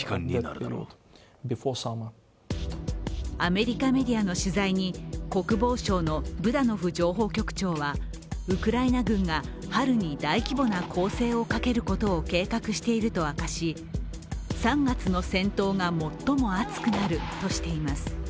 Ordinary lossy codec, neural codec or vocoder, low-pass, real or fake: none; none; none; real